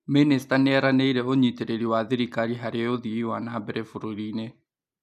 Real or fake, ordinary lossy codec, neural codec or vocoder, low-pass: real; none; none; 14.4 kHz